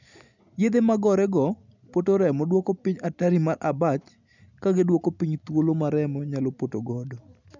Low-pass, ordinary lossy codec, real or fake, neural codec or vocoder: 7.2 kHz; none; real; none